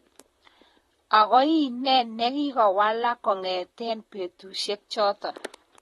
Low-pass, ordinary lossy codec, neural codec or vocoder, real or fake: 19.8 kHz; AAC, 32 kbps; vocoder, 44.1 kHz, 128 mel bands, Pupu-Vocoder; fake